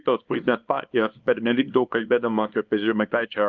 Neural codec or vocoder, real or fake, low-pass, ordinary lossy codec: codec, 24 kHz, 0.9 kbps, WavTokenizer, small release; fake; 7.2 kHz; Opus, 24 kbps